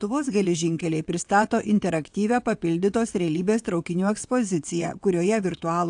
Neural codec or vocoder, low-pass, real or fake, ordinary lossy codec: vocoder, 22.05 kHz, 80 mel bands, WaveNeXt; 9.9 kHz; fake; AAC, 64 kbps